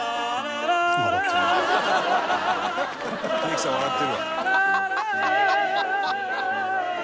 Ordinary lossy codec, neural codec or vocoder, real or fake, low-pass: none; none; real; none